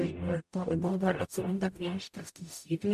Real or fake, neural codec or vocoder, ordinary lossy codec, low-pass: fake; codec, 44.1 kHz, 0.9 kbps, DAC; MP3, 64 kbps; 14.4 kHz